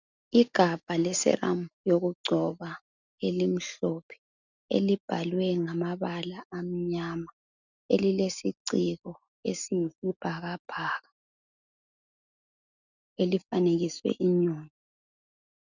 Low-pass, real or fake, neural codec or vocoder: 7.2 kHz; real; none